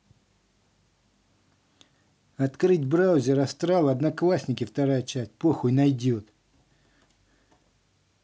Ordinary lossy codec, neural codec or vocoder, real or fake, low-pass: none; none; real; none